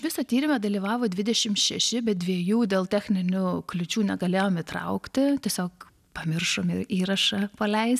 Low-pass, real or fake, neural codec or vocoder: 14.4 kHz; real; none